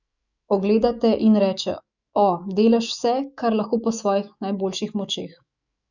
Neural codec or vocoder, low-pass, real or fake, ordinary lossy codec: autoencoder, 48 kHz, 128 numbers a frame, DAC-VAE, trained on Japanese speech; 7.2 kHz; fake; none